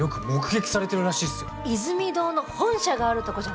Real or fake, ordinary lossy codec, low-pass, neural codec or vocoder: real; none; none; none